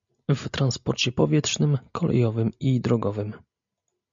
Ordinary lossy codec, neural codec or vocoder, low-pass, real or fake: MP3, 64 kbps; none; 7.2 kHz; real